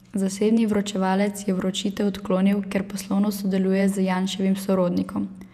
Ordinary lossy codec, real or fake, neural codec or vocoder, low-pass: none; fake; vocoder, 48 kHz, 128 mel bands, Vocos; 14.4 kHz